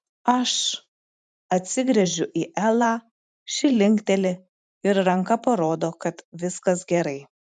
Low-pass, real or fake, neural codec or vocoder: 10.8 kHz; fake; vocoder, 44.1 kHz, 128 mel bands every 512 samples, BigVGAN v2